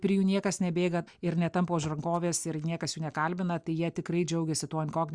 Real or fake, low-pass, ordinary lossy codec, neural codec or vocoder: real; 9.9 kHz; Opus, 64 kbps; none